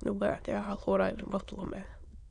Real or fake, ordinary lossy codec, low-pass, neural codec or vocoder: fake; none; 9.9 kHz; autoencoder, 22.05 kHz, a latent of 192 numbers a frame, VITS, trained on many speakers